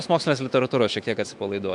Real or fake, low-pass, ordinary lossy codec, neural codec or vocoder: fake; 10.8 kHz; MP3, 64 kbps; autoencoder, 48 kHz, 128 numbers a frame, DAC-VAE, trained on Japanese speech